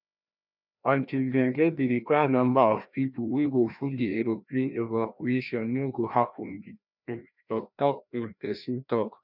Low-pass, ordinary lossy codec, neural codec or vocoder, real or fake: 5.4 kHz; none; codec, 16 kHz, 1 kbps, FreqCodec, larger model; fake